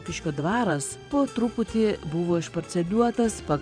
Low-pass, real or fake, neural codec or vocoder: 9.9 kHz; fake; vocoder, 24 kHz, 100 mel bands, Vocos